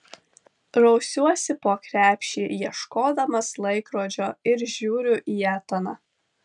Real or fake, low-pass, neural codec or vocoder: real; 10.8 kHz; none